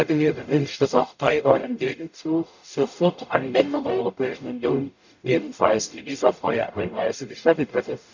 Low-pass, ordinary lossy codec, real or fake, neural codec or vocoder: 7.2 kHz; none; fake; codec, 44.1 kHz, 0.9 kbps, DAC